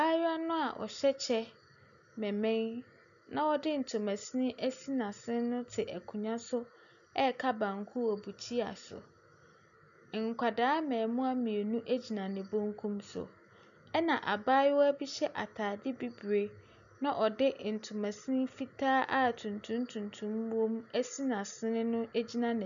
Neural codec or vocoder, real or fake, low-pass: none; real; 7.2 kHz